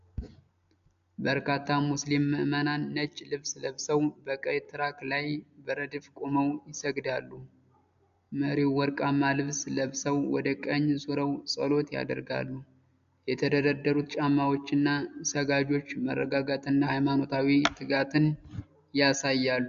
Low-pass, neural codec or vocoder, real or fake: 7.2 kHz; none; real